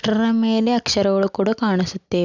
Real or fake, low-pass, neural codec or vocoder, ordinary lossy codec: real; 7.2 kHz; none; none